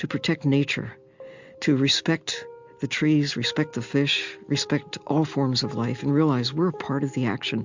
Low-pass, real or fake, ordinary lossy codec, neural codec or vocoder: 7.2 kHz; real; MP3, 64 kbps; none